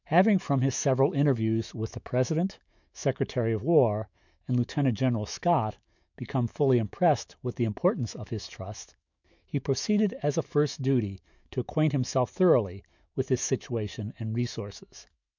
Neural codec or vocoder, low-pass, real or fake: autoencoder, 48 kHz, 128 numbers a frame, DAC-VAE, trained on Japanese speech; 7.2 kHz; fake